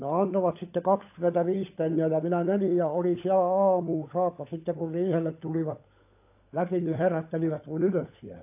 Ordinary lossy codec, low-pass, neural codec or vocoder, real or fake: AAC, 24 kbps; 3.6 kHz; codec, 16 kHz, 16 kbps, FunCodec, trained on LibriTTS, 50 frames a second; fake